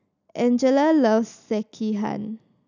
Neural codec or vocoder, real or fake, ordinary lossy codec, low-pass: none; real; none; 7.2 kHz